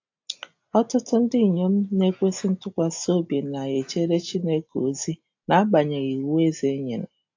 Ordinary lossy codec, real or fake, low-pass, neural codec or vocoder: AAC, 48 kbps; real; 7.2 kHz; none